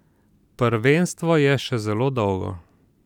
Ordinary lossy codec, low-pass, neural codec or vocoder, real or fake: none; 19.8 kHz; vocoder, 44.1 kHz, 128 mel bands every 512 samples, BigVGAN v2; fake